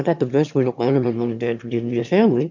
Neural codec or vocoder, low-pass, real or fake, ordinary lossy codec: autoencoder, 22.05 kHz, a latent of 192 numbers a frame, VITS, trained on one speaker; 7.2 kHz; fake; MP3, 64 kbps